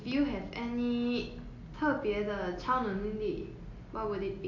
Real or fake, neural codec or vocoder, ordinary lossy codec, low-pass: real; none; AAC, 48 kbps; 7.2 kHz